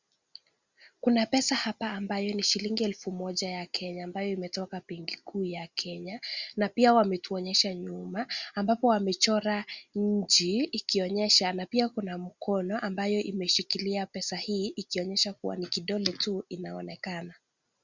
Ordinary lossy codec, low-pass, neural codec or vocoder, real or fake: Opus, 64 kbps; 7.2 kHz; none; real